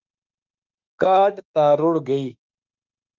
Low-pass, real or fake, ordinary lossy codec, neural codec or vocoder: 7.2 kHz; fake; Opus, 24 kbps; autoencoder, 48 kHz, 32 numbers a frame, DAC-VAE, trained on Japanese speech